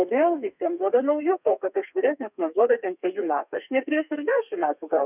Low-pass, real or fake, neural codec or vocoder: 3.6 kHz; fake; codec, 32 kHz, 1.9 kbps, SNAC